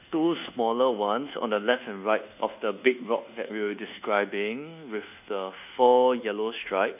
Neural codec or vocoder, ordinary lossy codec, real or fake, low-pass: codec, 24 kHz, 1.2 kbps, DualCodec; none; fake; 3.6 kHz